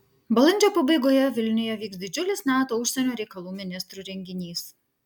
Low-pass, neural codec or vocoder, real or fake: 19.8 kHz; none; real